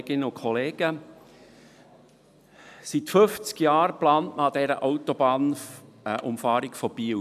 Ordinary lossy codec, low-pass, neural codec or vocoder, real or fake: none; 14.4 kHz; none; real